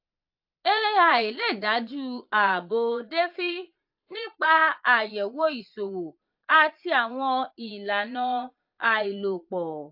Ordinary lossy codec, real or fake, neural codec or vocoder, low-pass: AAC, 48 kbps; fake; vocoder, 22.05 kHz, 80 mel bands, Vocos; 5.4 kHz